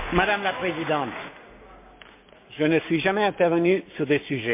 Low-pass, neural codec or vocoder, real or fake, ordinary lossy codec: 3.6 kHz; codec, 16 kHz, 6 kbps, DAC; fake; MP3, 32 kbps